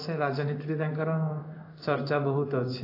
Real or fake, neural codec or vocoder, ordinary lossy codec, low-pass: fake; codec, 16 kHz in and 24 kHz out, 1 kbps, XY-Tokenizer; AAC, 32 kbps; 5.4 kHz